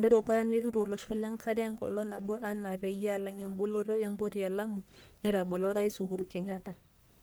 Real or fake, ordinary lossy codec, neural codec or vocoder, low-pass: fake; none; codec, 44.1 kHz, 1.7 kbps, Pupu-Codec; none